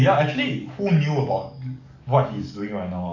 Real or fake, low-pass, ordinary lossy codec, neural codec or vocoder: fake; 7.2 kHz; none; codec, 44.1 kHz, 7.8 kbps, DAC